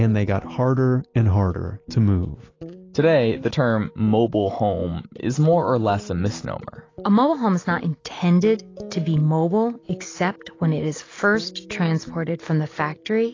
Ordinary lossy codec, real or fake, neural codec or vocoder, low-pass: AAC, 32 kbps; real; none; 7.2 kHz